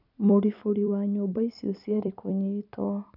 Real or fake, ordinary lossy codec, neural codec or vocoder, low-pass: fake; none; vocoder, 44.1 kHz, 128 mel bands every 256 samples, BigVGAN v2; 5.4 kHz